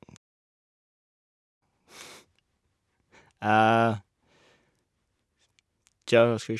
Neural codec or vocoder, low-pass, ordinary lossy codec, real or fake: none; none; none; real